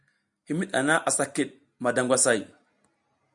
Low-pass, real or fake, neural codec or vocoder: 10.8 kHz; real; none